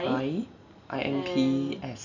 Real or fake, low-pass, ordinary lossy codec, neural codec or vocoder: real; 7.2 kHz; none; none